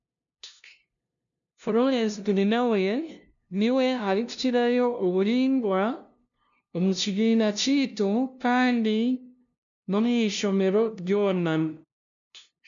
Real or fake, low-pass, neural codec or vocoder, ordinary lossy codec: fake; 7.2 kHz; codec, 16 kHz, 0.5 kbps, FunCodec, trained on LibriTTS, 25 frames a second; none